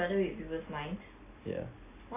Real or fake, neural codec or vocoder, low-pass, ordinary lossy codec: real; none; 3.6 kHz; AAC, 24 kbps